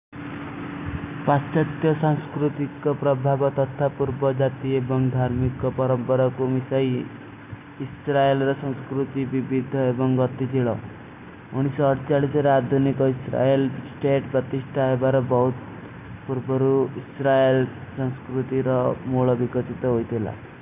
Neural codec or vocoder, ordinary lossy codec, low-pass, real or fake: none; none; 3.6 kHz; real